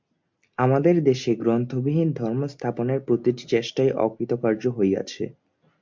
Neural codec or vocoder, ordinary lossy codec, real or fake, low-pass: none; MP3, 64 kbps; real; 7.2 kHz